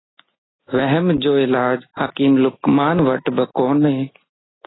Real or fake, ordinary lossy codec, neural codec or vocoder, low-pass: real; AAC, 16 kbps; none; 7.2 kHz